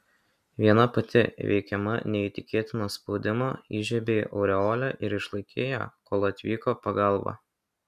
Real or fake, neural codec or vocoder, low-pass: real; none; 14.4 kHz